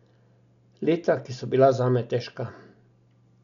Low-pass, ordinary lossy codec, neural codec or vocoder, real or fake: 7.2 kHz; none; none; real